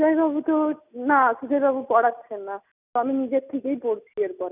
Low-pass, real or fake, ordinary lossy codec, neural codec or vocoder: 3.6 kHz; real; none; none